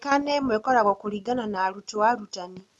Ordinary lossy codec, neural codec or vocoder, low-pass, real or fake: none; none; none; real